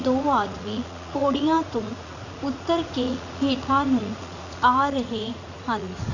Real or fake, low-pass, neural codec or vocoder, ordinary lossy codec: fake; 7.2 kHz; vocoder, 44.1 kHz, 80 mel bands, Vocos; none